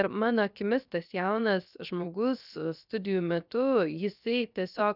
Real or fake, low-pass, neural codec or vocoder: fake; 5.4 kHz; codec, 16 kHz, 0.7 kbps, FocalCodec